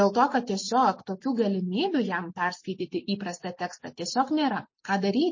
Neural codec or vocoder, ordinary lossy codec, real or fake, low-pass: none; MP3, 32 kbps; real; 7.2 kHz